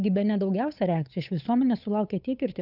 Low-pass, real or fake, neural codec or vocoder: 5.4 kHz; fake; codec, 16 kHz, 8 kbps, FunCodec, trained on Chinese and English, 25 frames a second